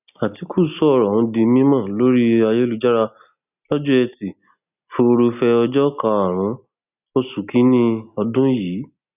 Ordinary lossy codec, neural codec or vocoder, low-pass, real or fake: none; none; 3.6 kHz; real